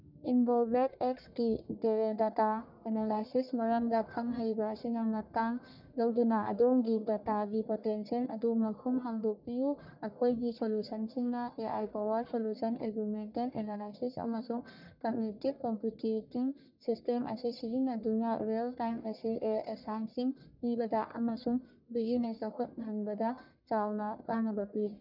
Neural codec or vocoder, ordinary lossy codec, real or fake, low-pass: codec, 44.1 kHz, 1.7 kbps, Pupu-Codec; none; fake; 5.4 kHz